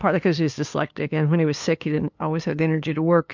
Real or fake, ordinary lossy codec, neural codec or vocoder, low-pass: fake; MP3, 64 kbps; codec, 16 kHz, 2 kbps, FunCodec, trained on LibriTTS, 25 frames a second; 7.2 kHz